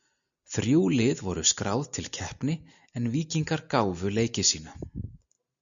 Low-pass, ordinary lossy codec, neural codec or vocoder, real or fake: 7.2 kHz; MP3, 96 kbps; none; real